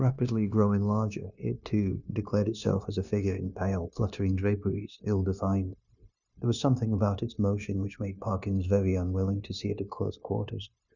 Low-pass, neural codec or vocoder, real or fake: 7.2 kHz; codec, 16 kHz, 0.9 kbps, LongCat-Audio-Codec; fake